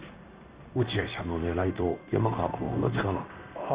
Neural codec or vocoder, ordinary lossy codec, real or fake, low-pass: codec, 16 kHz in and 24 kHz out, 1 kbps, XY-Tokenizer; Opus, 64 kbps; fake; 3.6 kHz